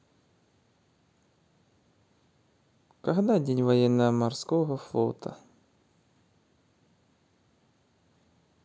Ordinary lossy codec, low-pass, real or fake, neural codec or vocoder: none; none; real; none